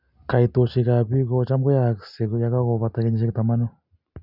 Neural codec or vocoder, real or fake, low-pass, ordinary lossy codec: none; real; 5.4 kHz; none